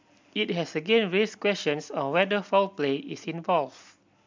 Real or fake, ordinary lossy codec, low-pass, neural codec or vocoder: real; MP3, 64 kbps; 7.2 kHz; none